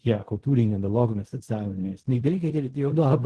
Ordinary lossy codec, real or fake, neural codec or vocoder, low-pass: Opus, 16 kbps; fake; codec, 16 kHz in and 24 kHz out, 0.4 kbps, LongCat-Audio-Codec, fine tuned four codebook decoder; 10.8 kHz